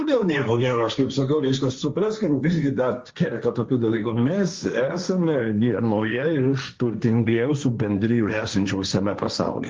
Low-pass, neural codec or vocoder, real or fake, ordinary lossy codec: 7.2 kHz; codec, 16 kHz, 1.1 kbps, Voila-Tokenizer; fake; Opus, 32 kbps